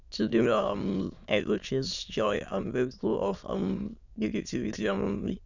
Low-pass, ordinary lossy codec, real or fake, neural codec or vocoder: 7.2 kHz; none; fake; autoencoder, 22.05 kHz, a latent of 192 numbers a frame, VITS, trained on many speakers